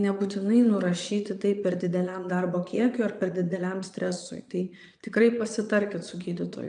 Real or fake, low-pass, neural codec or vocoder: fake; 9.9 kHz; vocoder, 22.05 kHz, 80 mel bands, Vocos